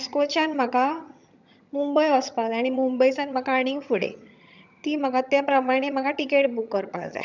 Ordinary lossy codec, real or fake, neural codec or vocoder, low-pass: none; fake; vocoder, 22.05 kHz, 80 mel bands, HiFi-GAN; 7.2 kHz